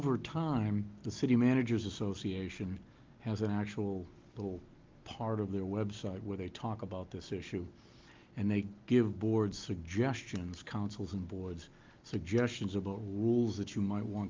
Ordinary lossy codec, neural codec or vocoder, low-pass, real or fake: Opus, 32 kbps; none; 7.2 kHz; real